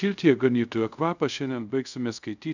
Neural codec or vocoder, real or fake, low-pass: codec, 24 kHz, 0.5 kbps, DualCodec; fake; 7.2 kHz